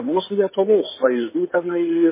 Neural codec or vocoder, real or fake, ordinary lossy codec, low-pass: codec, 16 kHz in and 24 kHz out, 2.2 kbps, FireRedTTS-2 codec; fake; MP3, 16 kbps; 3.6 kHz